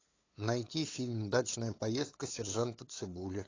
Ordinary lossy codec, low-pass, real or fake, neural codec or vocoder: AAC, 32 kbps; 7.2 kHz; fake; codec, 16 kHz, 8 kbps, FunCodec, trained on LibriTTS, 25 frames a second